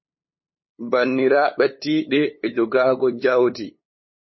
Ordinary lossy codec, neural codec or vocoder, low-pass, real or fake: MP3, 24 kbps; codec, 16 kHz, 8 kbps, FunCodec, trained on LibriTTS, 25 frames a second; 7.2 kHz; fake